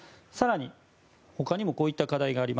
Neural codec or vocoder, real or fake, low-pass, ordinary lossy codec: none; real; none; none